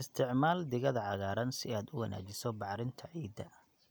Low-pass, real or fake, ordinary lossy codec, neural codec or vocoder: none; real; none; none